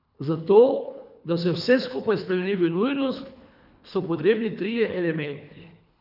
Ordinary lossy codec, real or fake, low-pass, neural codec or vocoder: none; fake; 5.4 kHz; codec, 24 kHz, 3 kbps, HILCodec